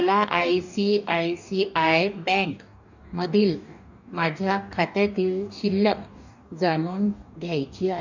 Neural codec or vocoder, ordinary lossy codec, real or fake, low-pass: codec, 44.1 kHz, 2.6 kbps, DAC; none; fake; 7.2 kHz